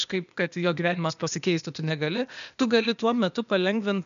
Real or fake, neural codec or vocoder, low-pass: fake; codec, 16 kHz, 0.8 kbps, ZipCodec; 7.2 kHz